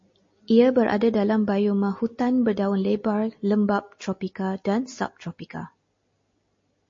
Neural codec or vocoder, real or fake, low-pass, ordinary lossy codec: none; real; 7.2 kHz; MP3, 32 kbps